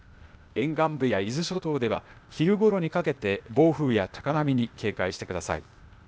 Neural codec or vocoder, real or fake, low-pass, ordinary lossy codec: codec, 16 kHz, 0.8 kbps, ZipCodec; fake; none; none